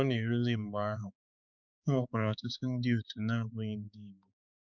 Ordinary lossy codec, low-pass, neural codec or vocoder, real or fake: none; 7.2 kHz; codec, 16 kHz, 4 kbps, X-Codec, HuBERT features, trained on balanced general audio; fake